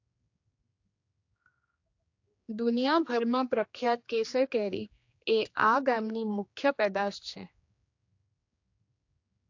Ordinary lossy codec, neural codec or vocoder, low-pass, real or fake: AAC, 48 kbps; codec, 16 kHz, 2 kbps, X-Codec, HuBERT features, trained on general audio; 7.2 kHz; fake